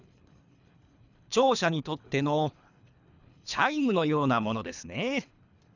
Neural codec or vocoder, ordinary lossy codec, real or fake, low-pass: codec, 24 kHz, 3 kbps, HILCodec; none; fake; 7.2 kHz